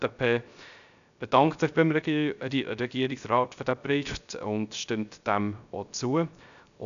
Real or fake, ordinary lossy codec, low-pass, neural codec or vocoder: fake; none; 7.2 kHz; codec, 16 kHz, 0.3 kbps, FocalCodec